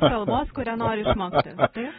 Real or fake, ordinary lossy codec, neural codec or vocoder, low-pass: real; AAC, 16 kbps; none; 19.8 kHz